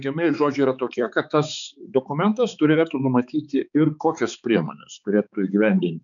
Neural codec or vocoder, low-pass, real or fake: codec, 16 kHz, 4 kbps, X-Codec, HuBERT features, trained on balanced general audio; 7.2 kHz; fake